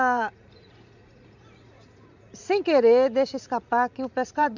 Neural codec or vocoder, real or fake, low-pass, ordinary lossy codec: vocoder, 44.1 kHz, 80 mel bands, Vocos; fake; 7.2 kHz; none